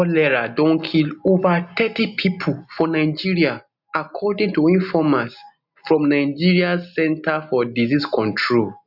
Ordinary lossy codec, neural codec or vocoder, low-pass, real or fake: none; none; 5.4 kHz; real